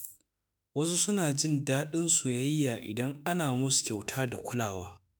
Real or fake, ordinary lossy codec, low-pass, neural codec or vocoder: fake; none; none; autoencoder, 48 kHz, 32 numbers a frame, DAC-VAE, trained on Japanese speech